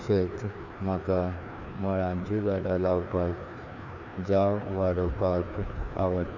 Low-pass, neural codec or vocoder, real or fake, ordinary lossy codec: 7.2 kHz; codec, 16 kHz, 2 kbps, FreqCodec, larger model; fake; none